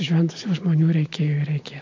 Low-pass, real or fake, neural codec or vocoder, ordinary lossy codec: 7.2 kHz; real; none; MP3, 48 kbps